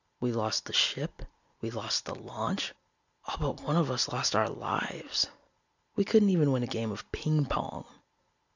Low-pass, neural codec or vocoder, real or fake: 7.2 kHz; none; real